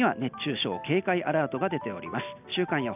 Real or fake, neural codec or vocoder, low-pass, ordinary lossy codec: real; none; 3.6 kHz; none